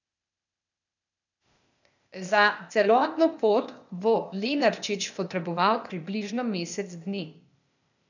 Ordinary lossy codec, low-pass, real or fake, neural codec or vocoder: none; 7.2 kHz; fake; codec, 16 kHz, 0.8 kbps, ZipCodec